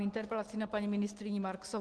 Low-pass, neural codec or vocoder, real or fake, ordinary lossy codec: 10.8 kHz; none; real; Opus, 16 kbps